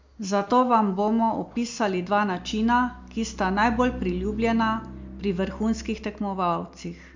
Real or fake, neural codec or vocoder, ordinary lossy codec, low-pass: real; none; MP3, 64 kbps; 7.2 kHz